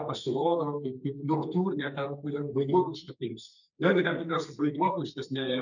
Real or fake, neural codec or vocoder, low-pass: fake; codec, 32 kHz, 1.9 kbps, SNAC; 7.2 kHz